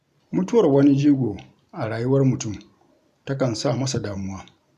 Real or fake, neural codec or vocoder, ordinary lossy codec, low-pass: fake; vocoder, 44.1 kHz, 128 mel bands every 512 samples, BigVGAN v2; none; 14.4 kHz